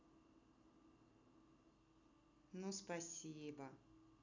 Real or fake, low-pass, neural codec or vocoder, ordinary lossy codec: real; 7.2 kHz; none; none